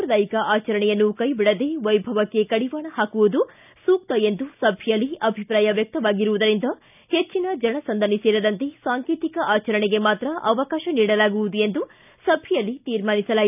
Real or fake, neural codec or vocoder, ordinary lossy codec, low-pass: real; none; none; 3.6 kHz